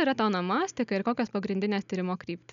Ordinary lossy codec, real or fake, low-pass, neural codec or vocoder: MP3, 96 kbps; real; 7.2 kHz; none